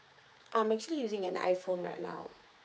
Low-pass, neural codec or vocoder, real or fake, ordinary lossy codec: none; codec, 16 kHz, 4 kbps, X-Codec, HuBERT features, trained on general audio; fake; none